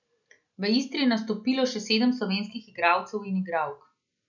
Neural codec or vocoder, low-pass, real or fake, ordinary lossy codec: none; 7.2 kHz; real; none